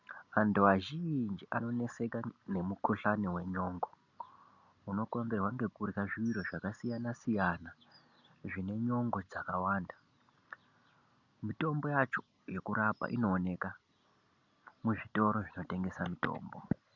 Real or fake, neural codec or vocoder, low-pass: real; none; 7.2 kHz